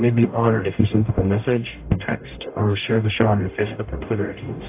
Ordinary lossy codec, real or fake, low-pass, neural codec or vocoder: AAC, 24 kbps; fake; 3.6 kHz; codec, 44.1 kHz, 0.9 kbps, DAC